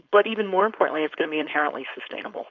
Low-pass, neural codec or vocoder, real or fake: 7.2 kHz; codec, 16 kHz, 4.8 kbps, FACodec; fake